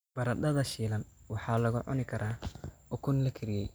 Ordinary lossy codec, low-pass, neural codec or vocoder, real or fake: none; none; none; real